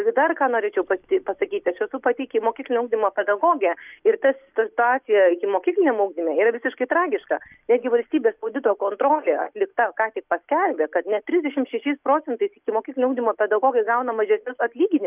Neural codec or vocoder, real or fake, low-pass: none; real; 3.6 kHz